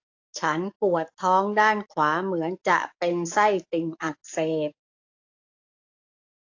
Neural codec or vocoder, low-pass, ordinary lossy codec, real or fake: codec, 44.1 kHz, 7.8 kbps, DAC; 7.2 kHz; AAC, 48 kbps; fake